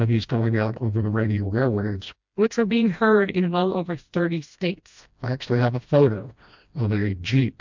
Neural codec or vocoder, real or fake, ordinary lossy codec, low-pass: codec, 16 kHz, 1 kbps, FreqCodec, smaller model; fake; MP3, 64 kbps; 7.2 kHz